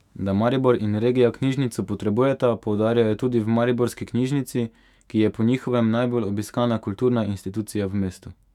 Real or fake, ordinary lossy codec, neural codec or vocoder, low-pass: fake; none; autoencoder, 48 kHz, 128 numbers a frame, DAC-VAE, trained on Japanese speech; 19.8 kHz